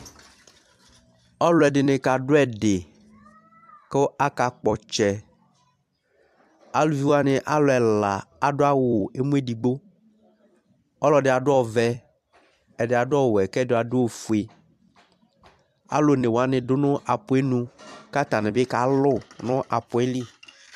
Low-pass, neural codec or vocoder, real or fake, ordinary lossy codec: 14.4 kHz; vocoder, 44.1 kHz, 128 mel bands every 256 samples, BigVGAN v2; fake; AAC, 96 kbps